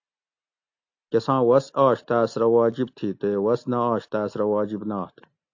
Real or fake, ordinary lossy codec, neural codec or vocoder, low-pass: real; AAC, 48 kbps; none; 7.2 kHz